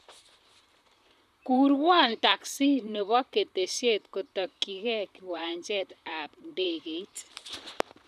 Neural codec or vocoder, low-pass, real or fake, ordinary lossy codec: vocoder, 44.1 kHz, 128 mel bands, Pupu-Vocoder; 14.4 kHz; fake; none